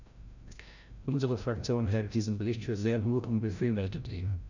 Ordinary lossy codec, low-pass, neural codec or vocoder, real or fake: none; 7.2 kHz; codec, 16 kHz, 0.5 kbps, FreqCodec, larger model; fake